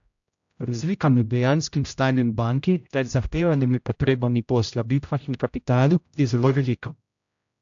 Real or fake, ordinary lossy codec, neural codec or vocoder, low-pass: fake; AAC, 64 kbps; codec, 16 kHz, 0.5 kbps, X-Codec, HuBERT features, trained on general audio; 7.2 kHz